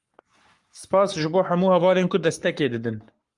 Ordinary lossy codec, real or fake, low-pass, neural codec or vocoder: Opus, 32 kbps; fake; 10.8 kHz; codec, 44.1 kHz, 7.8 kbps, Pupu-Codec